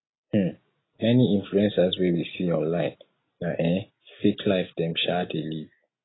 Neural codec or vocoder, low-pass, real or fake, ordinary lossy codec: none; 7.2 kHz; real; AAC, 16 kbps